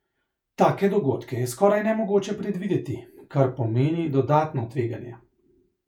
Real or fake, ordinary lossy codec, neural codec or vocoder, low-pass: fake; none; vocoder, 48 kHz, 128 mel bands, Vocos; 19.8 kHz